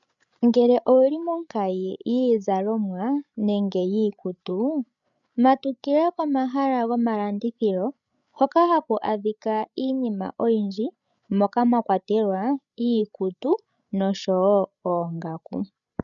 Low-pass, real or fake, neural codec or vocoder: 7.2 kHz; fake; codec, 16 kHz, 16 kbps, FreqCodec, larger model